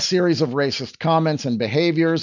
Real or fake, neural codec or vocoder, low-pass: real; none; 7.2 kHz